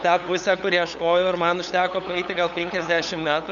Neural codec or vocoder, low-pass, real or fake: codec, 16 kHz, 8 kbps, FunCodec, trained on LibriTTS, 25 frames a second; 7.2 kHz; fake